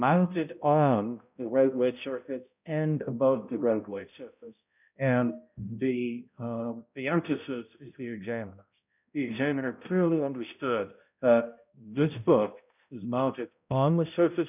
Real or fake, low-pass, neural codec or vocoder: fake; 3.6 kHz; codec, 16 kHz, 0.5 kbps, X-Codec, HuBERT features, trained on balanced general audio